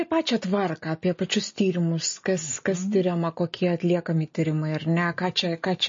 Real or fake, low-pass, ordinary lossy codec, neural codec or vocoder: real; 7.2 kHz; MP3, 32 kbps; none